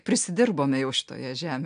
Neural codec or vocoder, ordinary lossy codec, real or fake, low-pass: none; AAC, 96 kbps; real; 9.9 kHz